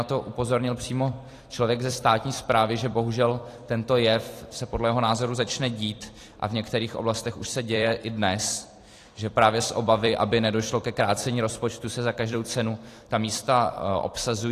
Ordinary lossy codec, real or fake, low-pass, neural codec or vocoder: AAC, 48 kbps; fake; 14.4 kHz; vocoder, 44.1 kHz, 128 mel bands every 256 samples, BigVGAN v2